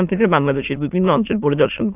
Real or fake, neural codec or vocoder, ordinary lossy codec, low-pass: fake; autoencoder, 22.05 kHz, a latent of 192 numbers a frame, VITS, trained on many speakers; AAC, 32 kbps; 3.6 kHz